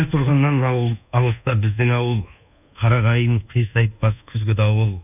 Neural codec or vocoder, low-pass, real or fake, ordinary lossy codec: codec, 24 kHz, 1.2 kbps, DualCodec; 3.6 kHz; fake; none